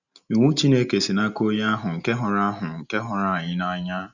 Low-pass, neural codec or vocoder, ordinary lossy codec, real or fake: 7.2 kHz; none; none; real